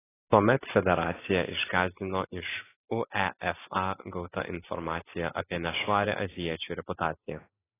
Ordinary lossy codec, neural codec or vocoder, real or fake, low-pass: AAC, 16 kbps; none; real; 3.6 kHz